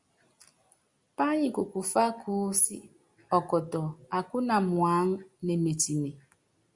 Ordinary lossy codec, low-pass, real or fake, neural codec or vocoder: Opus, 64 kbps; 10.8 kHz; real; none